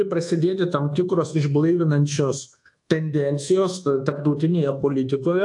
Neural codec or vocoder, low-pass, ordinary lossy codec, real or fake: codec, 24 kHz, 1.2 kbps, DualCodec; 10.8 kHz; AAC, 64 kbps; fake